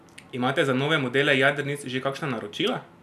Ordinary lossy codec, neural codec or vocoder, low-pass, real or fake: none; none; 14.4 kHz; real